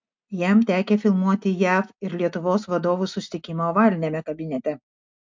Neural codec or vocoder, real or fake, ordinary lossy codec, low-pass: none; real; MP3, 64 kbps; 7.2 kHz